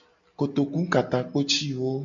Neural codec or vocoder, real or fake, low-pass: none; real; 7.2 kHz